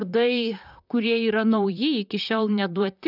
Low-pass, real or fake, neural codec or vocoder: 5.4 kHz; fake; vocoder, 44.1 kHz, 128 mel bands, Pupu-Vocoder